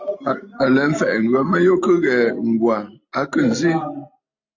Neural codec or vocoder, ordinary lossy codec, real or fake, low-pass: none; AAC, 48 kbps; real; 7.2 kHz